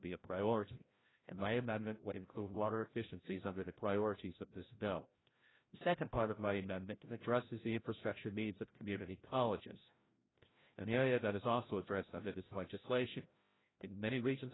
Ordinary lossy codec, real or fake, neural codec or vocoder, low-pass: AAC, 16 kbps; fake; codec, 16 kHz, 0.5 kbps, FreqCodec, larger model; 7.2 kHz